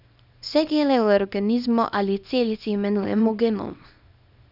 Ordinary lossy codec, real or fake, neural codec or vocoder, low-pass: none; fake; codec, 24 kHz, 0.9 kbps, WavTokenizer, small release; 5.4 kHz